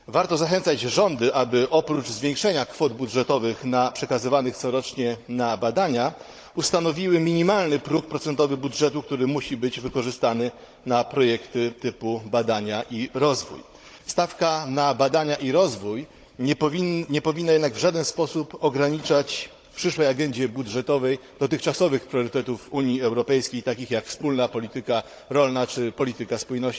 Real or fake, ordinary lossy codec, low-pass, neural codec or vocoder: fake; none; none; codec, 16 kHz, 16 kbps, FunCodec, trained on Chinese and English, 50 frames a second